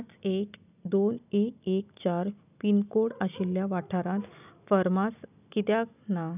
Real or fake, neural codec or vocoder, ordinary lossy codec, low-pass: real; none; none; 3.6 kHz